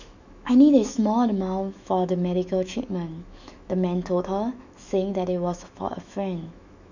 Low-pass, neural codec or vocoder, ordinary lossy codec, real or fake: 7.2 kHz; autoencoder, 48 kHz, 128 numbers a frame, DAC-VAE, trained on Japanese speech; none; fake